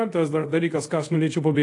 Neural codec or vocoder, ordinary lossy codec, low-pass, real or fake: codec, 24 kHz, 0.5 kbps, DualCodec; AAC, 48 kbps; 10.8 kHz; fake